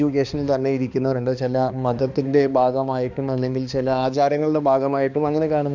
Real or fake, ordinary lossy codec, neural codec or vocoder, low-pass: fake; none; codec, 16 kHz, 2 kbps, X-Codec, HuBERT features, trained on balanced general audio; 7.2 kHz